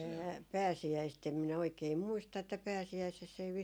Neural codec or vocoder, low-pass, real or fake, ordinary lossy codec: none; none; real; none